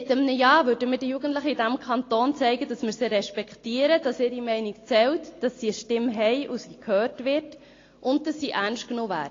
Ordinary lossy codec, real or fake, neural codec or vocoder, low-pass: AAC, 32 kbps; real; none; 7.2 kHz